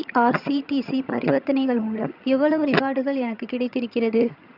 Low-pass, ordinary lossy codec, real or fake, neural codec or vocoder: 5.4 kHz; none; fake; vocoder, 22.05 kHz, 80 mel bands, HiFi-GAN